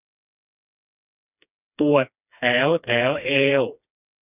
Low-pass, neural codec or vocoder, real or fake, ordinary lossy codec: 3.6 kHz; codec, 16 kHz, 2 kbps, FreqCodec, smaller model; fake; none